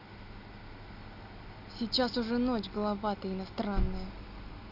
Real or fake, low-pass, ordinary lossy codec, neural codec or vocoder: real; 5.4 kHz; none; none